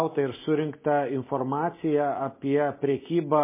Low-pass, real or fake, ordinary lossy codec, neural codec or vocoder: 3.6 kHz; real; MP3, 16 kbps; none